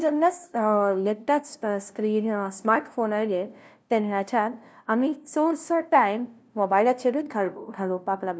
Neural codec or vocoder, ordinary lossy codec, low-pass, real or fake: codec, 16 kHz, 0.5 kbps, FunCodec, trained on LibriTTS, 25 frames a second; none; none; fake